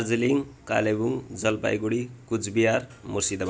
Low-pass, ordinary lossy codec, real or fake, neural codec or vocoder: none; none; real; none